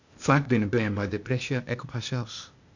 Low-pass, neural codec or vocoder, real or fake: 7.2 kHz; codec, 16 kHz, 0.8 kbps, ZipCodec; fake